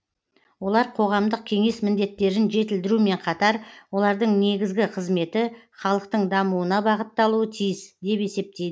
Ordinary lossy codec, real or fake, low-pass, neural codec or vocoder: none; real; none; none